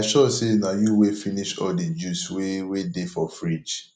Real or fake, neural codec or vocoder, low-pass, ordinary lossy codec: real; none; 9.9 kHz; none